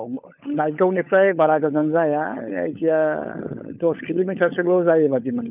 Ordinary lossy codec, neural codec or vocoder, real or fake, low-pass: none; codec, 16 kHz, 16 kbps, FunCodec, trained on LibriTTS, 50 frames a second; fake; 3.6 kHz